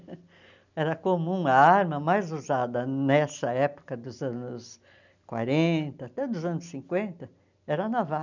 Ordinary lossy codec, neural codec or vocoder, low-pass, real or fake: none; none; 7.2 kHz; real